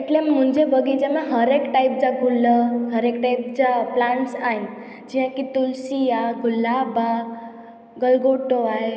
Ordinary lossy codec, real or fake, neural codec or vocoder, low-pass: none; real; none; none